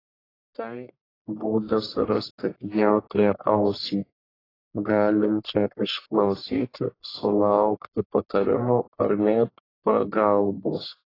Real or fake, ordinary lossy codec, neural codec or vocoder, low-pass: fake; AAC, 24 kbps; codec, 44.1 kHz, 1.7 kbps, Pupu-Codec; 5.4 kHz